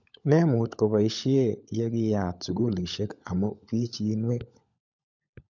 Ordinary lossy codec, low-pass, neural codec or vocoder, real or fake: none; 7.2 kHz; codec, 16 kHz, 16 kbps, FunCodec, trained on LibriTTS, 50 frames a second; fake